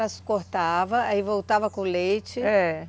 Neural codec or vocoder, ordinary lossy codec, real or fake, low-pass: none; none; real; none